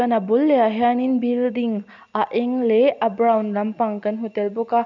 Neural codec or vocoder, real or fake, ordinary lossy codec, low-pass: none; real; none; 7.2 kHz